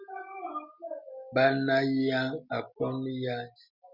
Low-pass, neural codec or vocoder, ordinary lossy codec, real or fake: 5.4 kHz; none; Opus, 64 kbps; real